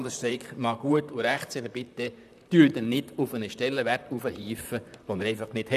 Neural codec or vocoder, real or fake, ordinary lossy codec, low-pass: vocoder, 44.1 kHz, 128 mel bands, Pupu-Vocoder; fake; none; 14.4 kHz